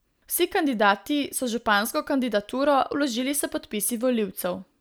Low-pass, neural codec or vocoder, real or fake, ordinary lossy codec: none; none; real; none